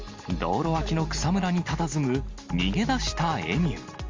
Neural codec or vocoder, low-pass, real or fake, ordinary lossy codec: none; 7.2 kHz; real; Opus, 32 kbps